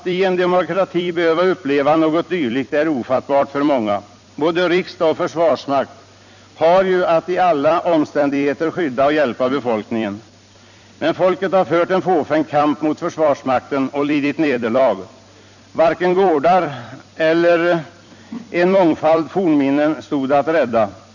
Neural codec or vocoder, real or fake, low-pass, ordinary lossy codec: vocoder, 44.1 kHz, 128 mel bands every 256 samples, BigVGAN v2; fake; 7.2 kHz; none